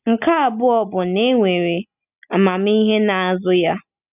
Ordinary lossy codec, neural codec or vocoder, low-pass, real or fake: none; none; 3.6 kHz; real